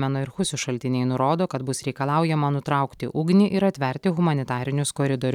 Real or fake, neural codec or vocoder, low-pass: real; none; 19.8 kHz